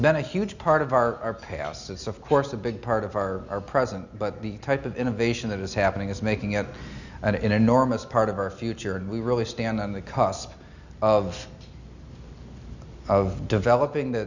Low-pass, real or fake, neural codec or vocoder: 7.2 kHz; real; none